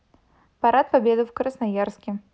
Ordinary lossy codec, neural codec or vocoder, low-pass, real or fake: none; none; none; real